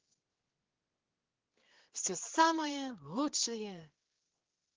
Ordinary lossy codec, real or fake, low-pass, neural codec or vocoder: Opus, 16 kbps; fake; 7.2 kHz; codec, 16 kHz, 4 kbps, X-Codec, HuBERT features, trained on general audio